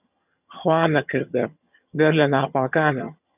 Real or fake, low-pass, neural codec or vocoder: fake; 3.6 kHz; vocoder, 22.05 kHz, 80 mel bands, HiFi-GAN